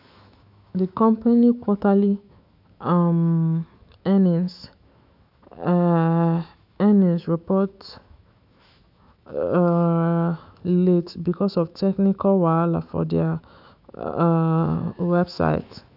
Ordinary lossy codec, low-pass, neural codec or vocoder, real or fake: AAC, 48 kbps; 5.4 kHz; autoencoder, 48 kHz, 128 numbers a frame, DAC-VAE, trained on Japanese speech; fake